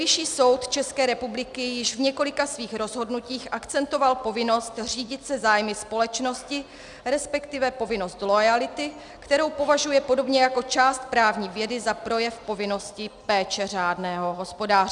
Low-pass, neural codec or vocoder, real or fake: 10.8 kHz; none; real